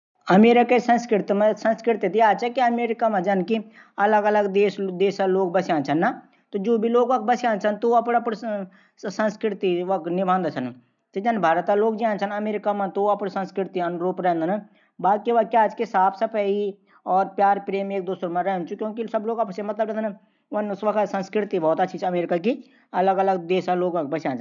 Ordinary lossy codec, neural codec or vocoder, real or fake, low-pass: none; none; real; 7.2 kHz